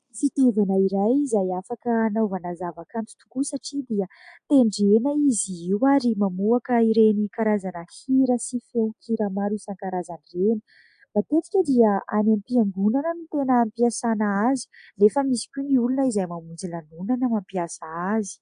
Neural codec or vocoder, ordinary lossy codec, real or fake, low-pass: none; AAC, 64 kbps; real; 9.9 kHz